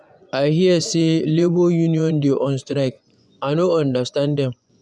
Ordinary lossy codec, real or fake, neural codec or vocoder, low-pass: none; fake; vocoder, 24 kHz, 100 mel bands, Vocos; none